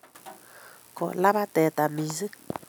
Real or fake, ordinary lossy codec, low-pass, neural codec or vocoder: real; none; none; none